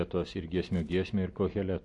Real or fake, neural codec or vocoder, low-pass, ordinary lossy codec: real; none; 10.8 kHz; MP3, 48 kbps